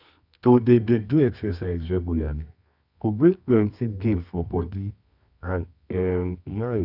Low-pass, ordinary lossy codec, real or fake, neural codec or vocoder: 5.4 kHz; none; fake; codec, 24 kHz, 0.9 kbps, WavTokenizer, medium music audio release